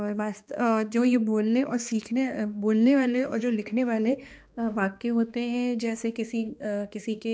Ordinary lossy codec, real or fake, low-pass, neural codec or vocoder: none; fake; none; codec, 16 kHz, 2 kbps, X-Codec, HuBERT features, trained on balanced general audio